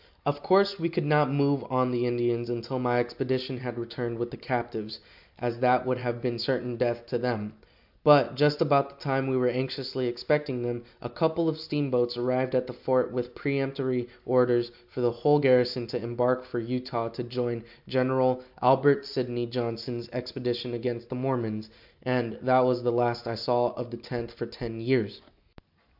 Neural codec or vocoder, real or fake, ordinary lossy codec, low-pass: none; real; AAC, 48 kbps; 5.4 kHz